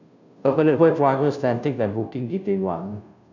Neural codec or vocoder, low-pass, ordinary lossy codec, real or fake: codec, 16 kHz, 0.5 kbps, FunCodec, trained on Chinese and English, 25 frames a second; 7.2 kHz; none; fake